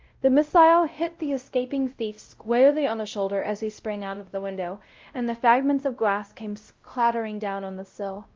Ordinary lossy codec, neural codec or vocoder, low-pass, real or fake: Opus, 24 kbps; codec, 16 kHz, 0.5 kbps, X-Codec, WavLM features, trained on Multilingual LibriSpeech; 7.2 kHz; fake